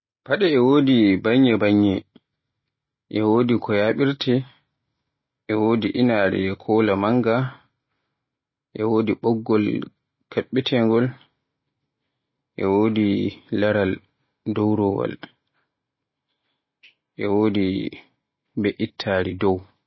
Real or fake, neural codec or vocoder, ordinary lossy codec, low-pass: real; none; MP3, 24 kbps; 7.2 kHz